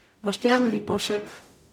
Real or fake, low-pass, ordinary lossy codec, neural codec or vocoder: fake; 19.8 kHz; none; codec, 44.1 kHz, 0.9 kbps, DAC